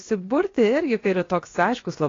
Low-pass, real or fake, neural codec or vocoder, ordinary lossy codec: 7.2 kHz; fake; codec, 16 kHz, 0.7 kbps, FocalCodec; AAC, 32 kbps